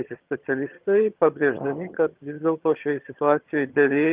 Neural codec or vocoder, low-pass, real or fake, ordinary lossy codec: vocoder, 22.05 kHz, 80 mel bands, HiFi-GAN; 3.6 kHz; fake; Opus, 32 kbps